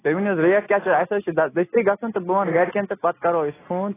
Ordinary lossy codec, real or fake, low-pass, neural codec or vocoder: AAC, 16 kbps; real; 3.6 kHz; none